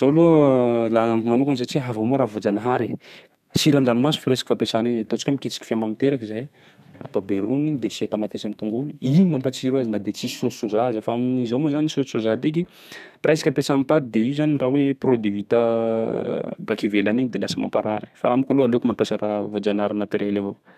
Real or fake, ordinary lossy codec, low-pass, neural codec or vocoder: fake; none; 14.4 kHz; codec, 32 kHz, 1.9 kbps, SNAC